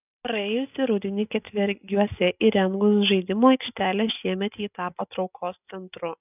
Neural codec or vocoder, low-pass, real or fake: none; 3.6 kHz; real